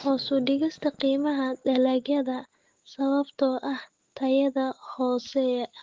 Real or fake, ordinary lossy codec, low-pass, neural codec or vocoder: fake; Opus, 16 kbps; 7.2 kHz; autoencoder, 48 kHz, 128 numbers a frame, DAC-VAE, trained on Japanese speech